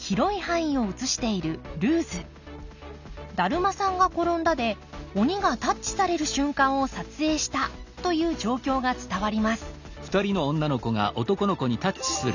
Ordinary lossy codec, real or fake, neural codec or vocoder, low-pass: none; real; none; 7.2 kHz